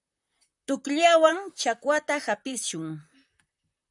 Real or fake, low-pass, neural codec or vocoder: fake; 10.8 kHz; vocoder, 44.1 kHz, 128 mel bands, Pupu-Vocoder